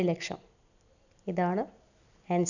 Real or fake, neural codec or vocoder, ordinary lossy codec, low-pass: real; none; none; 7.2 kHz